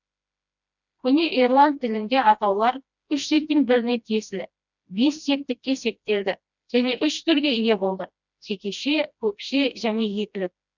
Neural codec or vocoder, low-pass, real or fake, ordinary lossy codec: codec, 16 kHz, 1 kbps, FreqCodec, smaller model; 7.2 kHz; fake; none